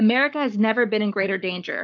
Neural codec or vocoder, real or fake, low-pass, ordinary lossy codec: codec, 44.1 kHz, 7.8 kbps, Pupu-Codec; fake; 7.2 kHz; MP3, 64 kbps